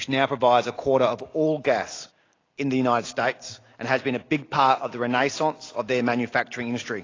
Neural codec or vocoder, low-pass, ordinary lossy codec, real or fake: none; 7.2 kHz; AAC, 32 kbps; real